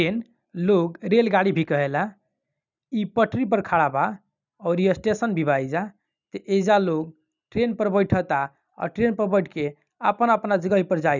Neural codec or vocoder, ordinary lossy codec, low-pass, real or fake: none; none; 7.2 kHz; real